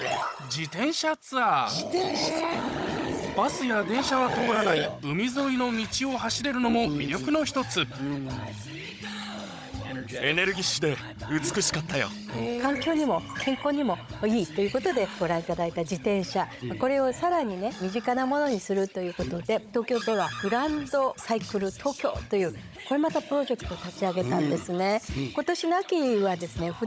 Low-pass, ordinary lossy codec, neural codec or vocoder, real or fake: none; none; codec, 16 kHz, 16 kbps, FunCodec, trained on Chinese and English, 50 frames a second; fake